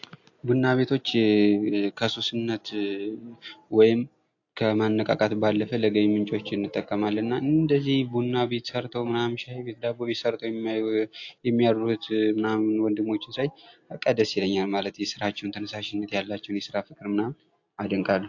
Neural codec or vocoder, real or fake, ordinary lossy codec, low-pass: none; real; AAC, 48 kbps; 7.2 kHz